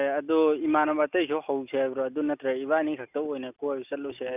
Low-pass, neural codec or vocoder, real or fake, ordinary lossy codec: 3.6 kHz; none; real; none